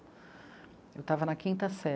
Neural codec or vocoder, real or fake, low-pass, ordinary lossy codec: none; real; none; none